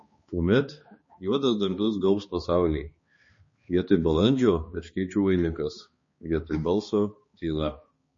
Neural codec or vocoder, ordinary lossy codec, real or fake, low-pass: codec, 16 kHz, 2 kbps, X-Codec, HuBERT features, trained on balanced general audio; MP3, 32 kbps; fake; 7.2 kHz